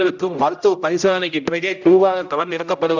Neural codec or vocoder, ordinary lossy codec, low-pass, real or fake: codec, 16 kHz, 0.5 kbps, X-Codec, HuBERT features, trained on general audio; none; 7.2 kHz; fake